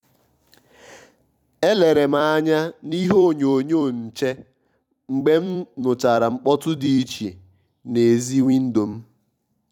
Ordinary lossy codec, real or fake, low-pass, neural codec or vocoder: none; fake; 19.8 kHz; vocoder, 44.1 kHz, 128 mel bands every 256 samples, BigVGAN v2